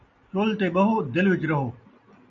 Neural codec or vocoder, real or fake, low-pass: none; real; 7.2 kHz